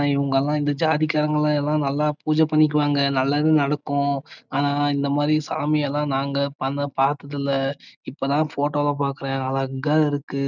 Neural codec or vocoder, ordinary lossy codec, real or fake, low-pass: none; none; real; 7.2 kHz